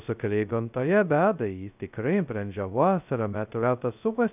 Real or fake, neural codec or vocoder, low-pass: fake; codec, 16 kHz, 0.2 kbps, FocalCodec; 3.6 kHz